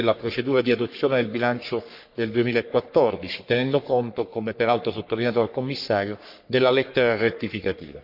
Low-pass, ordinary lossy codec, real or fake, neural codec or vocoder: 5.4 kHz; none; fake; codec, 44.1 kHz, 3.4 kbps, Pupu-Codec